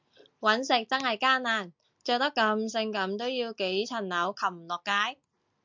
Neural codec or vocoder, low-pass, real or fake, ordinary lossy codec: none; 7.2 kHz; real; MP3, 64 kbps